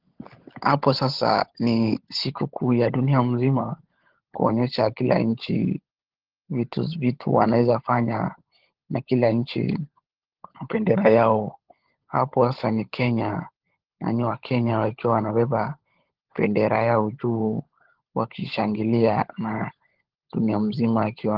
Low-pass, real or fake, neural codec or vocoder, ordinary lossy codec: 5.4 kHz; fake; codec, 16 kHz, 16 kbps, FunCodec, trained on LibriTTS, 50 frames a second; Opus, 16 kbps